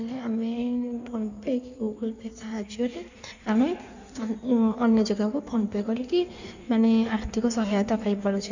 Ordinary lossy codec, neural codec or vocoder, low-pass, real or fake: none; codec, 16 kHz in and 24 kHz out, 1.1 kbps, FireRedTTS-2 codec; 7.2 kHz; fake